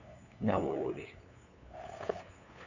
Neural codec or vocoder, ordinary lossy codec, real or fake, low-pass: codec, 16 kHz, 8 kbps, FunCodec, trained on LibriTTS, 25 frames a second; none; fake; 7.2 kHz